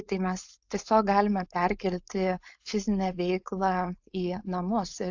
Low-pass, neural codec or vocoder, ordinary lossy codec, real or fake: 7.2 kHz; codec, 16 kHz, 4.8 kbps, FACodec; AAC, 48 kbps; fake